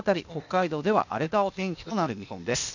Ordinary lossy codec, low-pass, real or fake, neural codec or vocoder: none; 7.2 kHz; fake; codec, 16 kHz, 0.8 kbps, ZipCodec